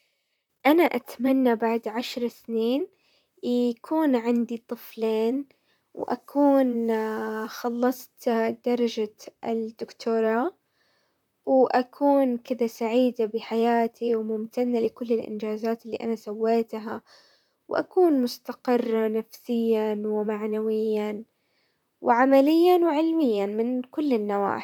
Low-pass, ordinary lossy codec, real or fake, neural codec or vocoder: 19.8 kHz; none; fake; vocoder, 44.1 kHz, 128 mel bands, Pupu-Vocoder